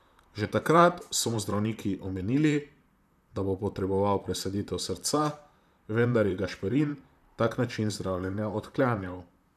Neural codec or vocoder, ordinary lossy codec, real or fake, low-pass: vocoder, 44.1 kHz, 128 mel bands, Pupu-Vocoder; none; fake; 14.4 kHz